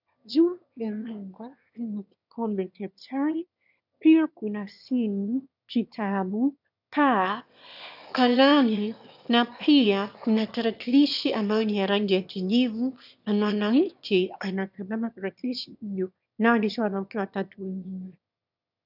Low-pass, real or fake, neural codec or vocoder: 5.4 kHz; fake; autoencoder, 22.05 kHz, a latent of 192 numbers a frame, VITS, trained on one speaker